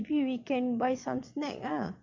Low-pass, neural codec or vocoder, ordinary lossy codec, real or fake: 7.2 kHz; vocoder, 22.05 kHz, 80 mel bands, Vocos; MP3, 64 kbps; fake